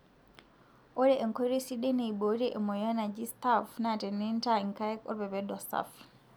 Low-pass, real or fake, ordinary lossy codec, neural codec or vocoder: none; real; none; none